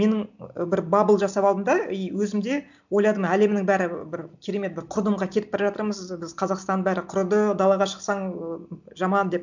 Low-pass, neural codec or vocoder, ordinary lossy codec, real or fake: 7.2 kHz; none; none; real